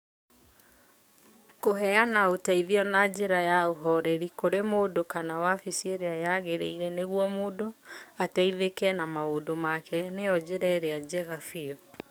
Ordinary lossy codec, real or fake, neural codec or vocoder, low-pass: none; fake; codec, 44.1 kHz, 7.8 kbps, DAC; none